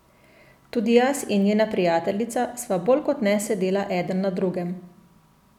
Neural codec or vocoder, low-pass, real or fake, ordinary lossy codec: none; 19.8 kHz; real; none